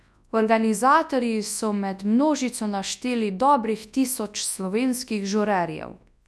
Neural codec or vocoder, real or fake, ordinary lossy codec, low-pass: codec, 24 kHz, 0.9 kbps, WavTokenizer, large speech release; fake; none; none